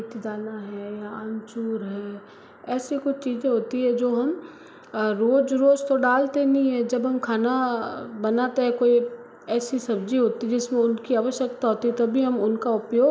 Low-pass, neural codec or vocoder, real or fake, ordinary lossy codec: none; none; real; none